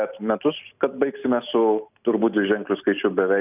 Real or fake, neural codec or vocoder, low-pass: real; none; 3.6 kHz